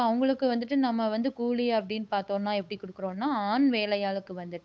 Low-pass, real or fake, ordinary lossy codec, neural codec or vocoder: none; fake; none; codec, 16 kHz, 8 kbps, FunCodec, trained on Chinese and English, 25 frames a second